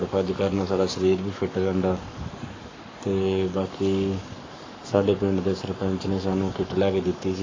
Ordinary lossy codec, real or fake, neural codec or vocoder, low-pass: AAC, 32 kbps; fake; codec, 44.1 kHz, 7.8 kbps, Pupu-Codec; 7.2 kHz